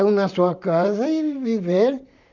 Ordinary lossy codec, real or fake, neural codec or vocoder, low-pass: none; fake; vocoder, 44.1 kHz, 128 mel bands, Pupu-Vocoder; 7.2 kHz